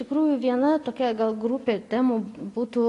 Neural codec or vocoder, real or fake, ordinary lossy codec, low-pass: none; real; AAC, 48 kbps; 10.8 kHz